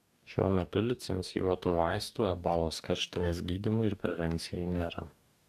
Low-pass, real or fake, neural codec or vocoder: 14.4 kHz; fake; codec, 44.1 kHz, 2.6 kbps, DAC